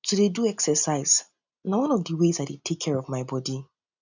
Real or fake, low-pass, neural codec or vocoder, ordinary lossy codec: real; 7.2 kHz; none; none